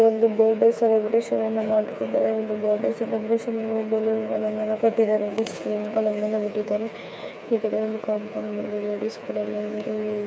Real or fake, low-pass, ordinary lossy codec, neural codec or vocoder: fake; none; none; codec, 16 kHz, 4 kbps, FreqCodec, smaller model